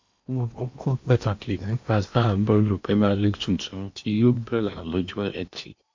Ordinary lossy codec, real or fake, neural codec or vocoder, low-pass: MP3, 48 kbps; fake; codec, 16 kHz in and 24 kHz out, 0.8 kbps, FocalCodec, streaming, 65536 codes; 7.2 kHz